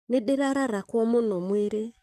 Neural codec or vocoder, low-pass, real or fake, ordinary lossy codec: codec, 44.1 kHz, 7.8 kbps, DAC; 14.4 kHz; fake; none